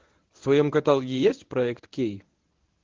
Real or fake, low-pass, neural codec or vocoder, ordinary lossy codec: fake; 7.2 kHz; codec, 24 kHz, 0.9 kbps, WavTokenizer, medium speech release version 1; Opus, 16 kbps